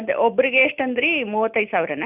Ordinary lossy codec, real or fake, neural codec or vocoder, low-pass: none; real; none; 3.6 kHz